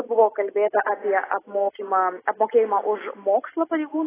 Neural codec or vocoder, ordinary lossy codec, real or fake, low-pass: none; AAC, 16 kbps; real; 3.6 kHz